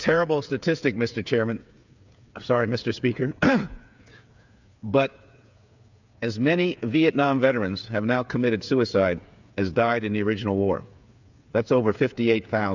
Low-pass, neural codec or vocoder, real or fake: 7.2 kHz; codec, 16 kHz, 8 kbps, FreqCodec, smaller model; fake